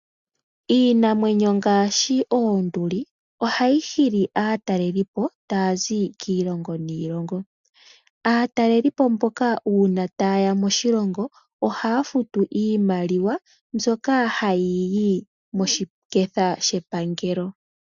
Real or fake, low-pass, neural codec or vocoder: real; 7.2 kHz; none